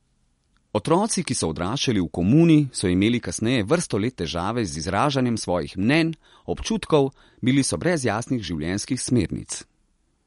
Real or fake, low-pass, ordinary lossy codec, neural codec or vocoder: real; 19.8 kHz; MP3, 48 kbps; none